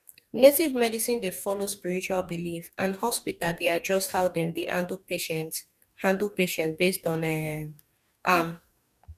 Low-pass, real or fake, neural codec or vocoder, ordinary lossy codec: 14.4 kHz; fake; codec, 44.1 kHz, 2.6 kbps, DAC; none